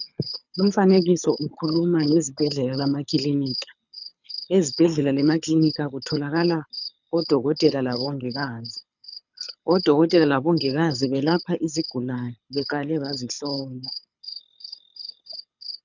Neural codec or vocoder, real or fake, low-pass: codec, 24 kHz, 6 kbps, HILCodec; fake; 7.2 kHz